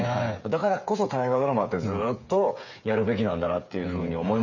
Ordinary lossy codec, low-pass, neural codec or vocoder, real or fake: none; 7.2 kHz; codec, 16 kHz, 8 kbps, FreqCodec, smaller model; fake